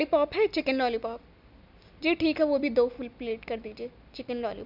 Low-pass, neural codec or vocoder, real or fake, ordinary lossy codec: 5.4 kHz; none; real; none